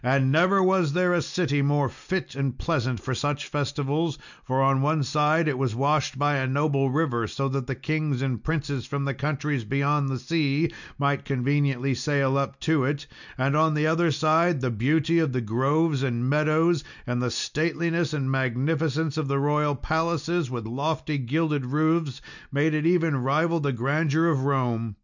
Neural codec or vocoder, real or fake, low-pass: none; real; 7.2 kHz